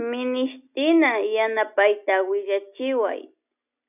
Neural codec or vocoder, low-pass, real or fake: none; 3.6 kHz; real